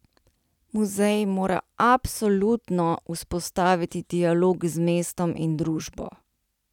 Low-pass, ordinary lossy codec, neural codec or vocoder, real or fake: 19.8 kHz; none; none; real